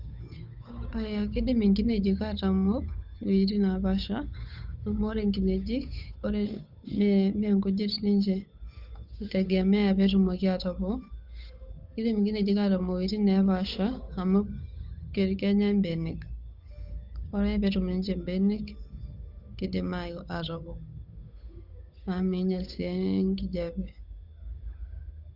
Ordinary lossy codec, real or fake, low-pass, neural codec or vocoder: Opus, 64 kbps; fake; 5.4 kHz; codec, 16 kHz, 8 kbps, FunCodec, trained on Chinese and English, 25 frames a second